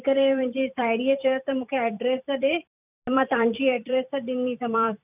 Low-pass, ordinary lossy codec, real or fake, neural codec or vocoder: 3.6 kHz; none; fake; vocoder, 44.1 kHz, 128 mel bands every 256 samples, BigVGAN v2